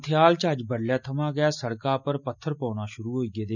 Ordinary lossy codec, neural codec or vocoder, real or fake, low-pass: none; none; real; 7.2 kHz